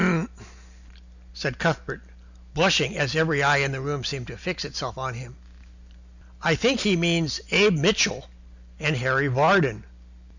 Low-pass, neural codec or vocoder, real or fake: 7.2 kHz; none; real